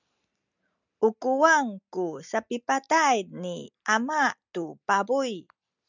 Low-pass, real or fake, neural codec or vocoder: 7.2 kHz; real; none